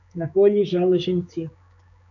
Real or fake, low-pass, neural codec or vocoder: fake; 7.2 kHz; codec, 16 kHz, 4 kbps, X-Codec, HuBERT features, trained on general audio